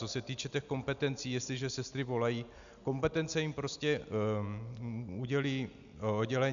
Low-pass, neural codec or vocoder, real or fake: 7.2 kHz; none; real